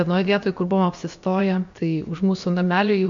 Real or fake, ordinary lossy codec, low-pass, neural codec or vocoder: fake; MP3, 48 kbps; 7.2 kHz; codec, 16 kHz, about 1 kbps, DyCAST, with the encoder's durations